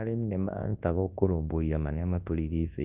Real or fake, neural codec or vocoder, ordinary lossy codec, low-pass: fake; codec, 24 kHz, 0.9 kbps, WavTokenizer, large speech release; Opus, 32 kbps; 3.6 kHz